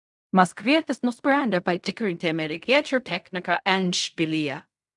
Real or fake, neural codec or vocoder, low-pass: fake; codec, 16 kHz in and 24 kHz out, 0.4 kbps, LongCat-Audio-Codec, fine tuned four codebook decoder; 10.8 kHz